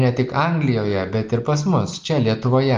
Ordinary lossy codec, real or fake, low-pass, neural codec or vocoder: Opus, 24 kbps; real; 7.2 kHz; none